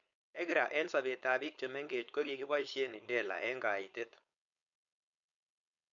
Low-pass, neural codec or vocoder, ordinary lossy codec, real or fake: 7.2 kHz; codec, 16 kHz, 4.8 kbps, FACodec; none; fake